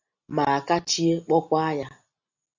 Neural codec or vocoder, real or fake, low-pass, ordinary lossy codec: none; real; 7.2 kHz; Opus, 64 kbps